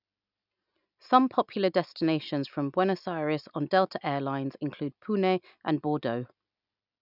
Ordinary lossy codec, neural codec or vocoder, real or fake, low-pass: none; none; real; 5.4 kHz